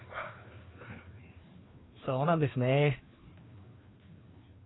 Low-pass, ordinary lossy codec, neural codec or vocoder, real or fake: 7.2 kHz; AAC, 16 kbps; codec, 16 kHz, 2 kbps, FreqCodec, larger model; fake